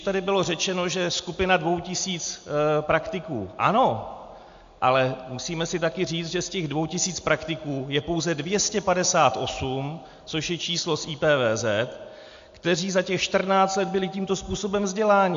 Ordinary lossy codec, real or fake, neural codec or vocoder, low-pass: AAC, 64 kbps; real; none; 7.2 kHz